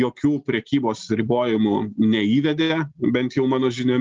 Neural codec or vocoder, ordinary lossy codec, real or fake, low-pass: none; Opus, 32 kbps; real; 7.2 kHz